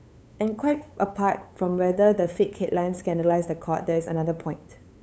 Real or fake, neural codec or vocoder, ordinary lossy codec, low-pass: fake; codec, 16 kHz, 8 kbps, FunCodec, trained on LibriTTS, 25 frames a second; none; none